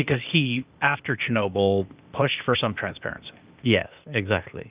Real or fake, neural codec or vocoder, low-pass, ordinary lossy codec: fake; codec, 16 kHz, 0.8 kbps, ZipCodec; 3.6 kHz; Opus, 24 kbps